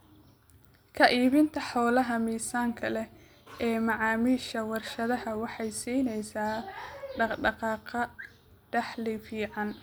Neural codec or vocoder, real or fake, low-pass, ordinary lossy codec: none; real; none; none